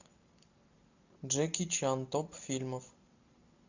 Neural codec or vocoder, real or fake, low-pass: none; real; 7.2 kHz